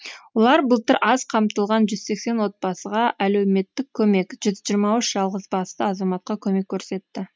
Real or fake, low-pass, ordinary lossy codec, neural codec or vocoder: real; none; none; none